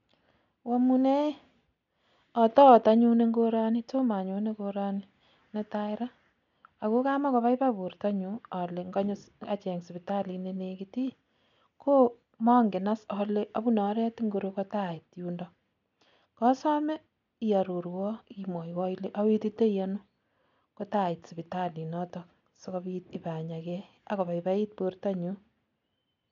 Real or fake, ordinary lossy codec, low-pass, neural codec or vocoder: real; none; 7.2 kHz; none